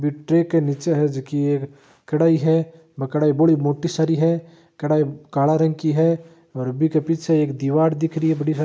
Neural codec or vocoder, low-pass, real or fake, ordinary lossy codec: none; none; real; none